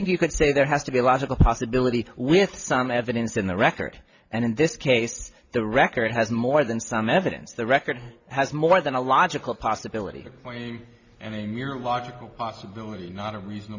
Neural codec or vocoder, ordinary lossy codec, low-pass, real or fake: none; Opus, 64 kbps; 7.2 kHz; real